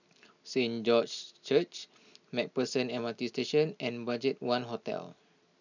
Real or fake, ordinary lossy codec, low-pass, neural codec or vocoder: real; none; 7.2 kHz; none